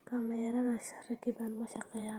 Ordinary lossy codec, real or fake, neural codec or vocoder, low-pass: Opus, 24 kbps; fake; vocoder, 48 kHz, 128 mel bands, Vocos; 19.8 kHz